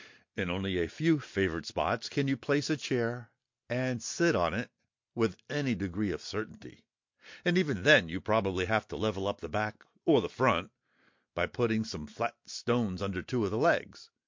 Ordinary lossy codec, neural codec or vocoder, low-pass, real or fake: MP3, 48 kbps; none; 7.2 kHz; real